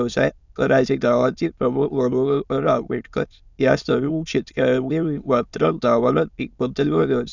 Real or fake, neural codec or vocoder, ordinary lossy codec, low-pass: fake; autoencoder, 22.05 kHz, a latent of 192 numbers a frame, VITS, trained on many speakers; none; 7.2 kHz